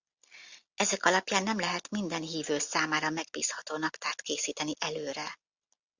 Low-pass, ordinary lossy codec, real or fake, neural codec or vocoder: 7.2 kHz; Opus, 64 kbps; real; none